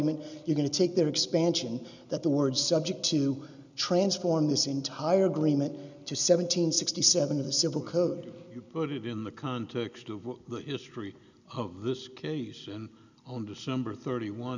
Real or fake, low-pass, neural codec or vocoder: real; 7.2 kHz; none